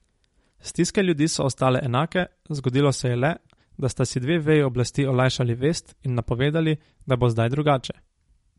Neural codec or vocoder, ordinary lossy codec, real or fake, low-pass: none; MP3, 48 kbps; real; 19.8 kHz